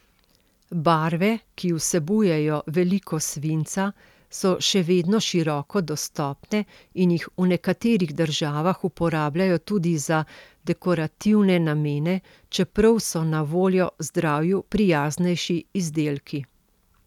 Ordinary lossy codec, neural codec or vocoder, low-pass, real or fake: none; none; 19.8 kHz; real